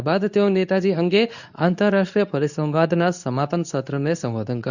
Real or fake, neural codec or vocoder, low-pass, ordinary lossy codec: fake; codec, 24 kHz, 0.9 kbps, WavTokenizer, medium speech release version 2; 7.2 kHz; none